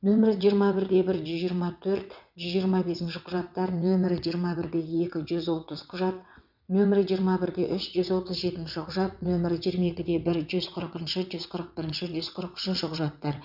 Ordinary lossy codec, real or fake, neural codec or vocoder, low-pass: none; fake; vocoder, 22.05 kHz, 80 mel bands, WaveNeXt; 5.4 kHz